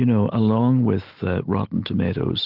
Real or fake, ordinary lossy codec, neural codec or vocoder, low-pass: real; Opus, 16 kbps; none; 5.4 kHz